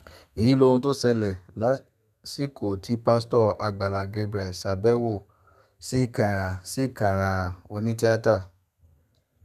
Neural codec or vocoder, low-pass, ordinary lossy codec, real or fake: codec, 32 kHz, 1.9 kbps, SNAC; 14.4 kHz; none; fake